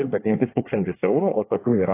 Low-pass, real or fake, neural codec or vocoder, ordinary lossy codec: 3.6 kHz; fake; codec, 24 kHz, 1 kbps, SNAC; AAC, 24 kbps